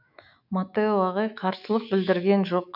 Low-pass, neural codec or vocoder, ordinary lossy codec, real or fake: 5.4 kHz; autoencoder, 48 kHz, 128 numbers a frame, DAC-VAE, trained on Japanese speech; none; fake